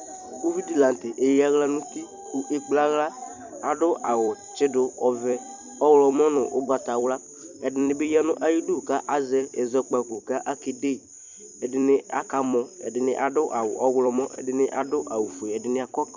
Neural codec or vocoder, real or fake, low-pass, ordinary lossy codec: vocoder, 44.1 kHz, 128 mel bands every 256 samples, BigVGAN v2; fake; 7.2 kHz; Opus, 64 kbps